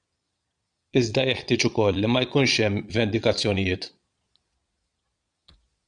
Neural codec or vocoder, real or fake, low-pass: vocoder, 22.05 kHz, 80 mel bands, Vocos; fake; 9.9 kHz